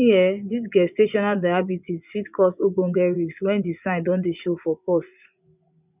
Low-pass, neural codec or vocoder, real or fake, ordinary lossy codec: 3.6 kHz; vocoder, 24 kHz, 100 mel bands, Vocos; fake; none